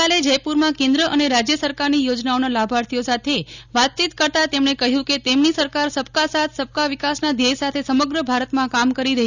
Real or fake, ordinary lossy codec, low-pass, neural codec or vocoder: real; none; 7.2 kHz; none